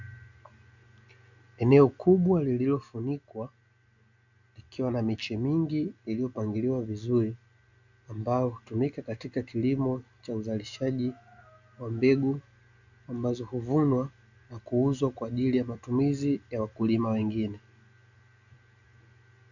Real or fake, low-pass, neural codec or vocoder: real; 7.2 kHz; none